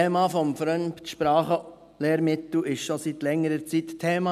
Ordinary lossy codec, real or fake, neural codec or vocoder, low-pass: none; real; none; 14.4 kHz